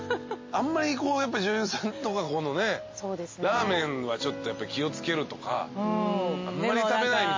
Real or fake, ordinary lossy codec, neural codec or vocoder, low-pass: real; MP3, 32 kbps; none; 7.2 kHz